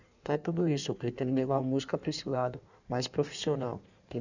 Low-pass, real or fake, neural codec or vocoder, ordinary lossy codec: 7.2 kHz; fake; codec, 16 kHz in and 24 kHz out, 1.1 kbps, FireRedTTS-2 codec; none